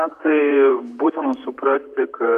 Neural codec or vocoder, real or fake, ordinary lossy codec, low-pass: vocoder, 44.1 kHz, 128 mel bands, Pupu-Vocoder; fake; AAC, 96 kbps; 14.4 kHz